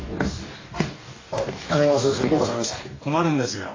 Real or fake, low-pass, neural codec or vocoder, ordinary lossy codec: fake; 7.2 kHz; codec, 44.1 kHz, 2.6 kbps, DAC; AAC, 32 kbps